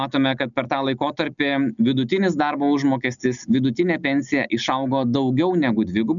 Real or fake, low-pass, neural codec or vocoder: real; 7.2 kHz; none